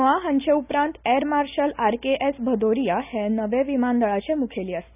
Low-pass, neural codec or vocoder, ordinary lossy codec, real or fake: 3.6 kHz; none; none; real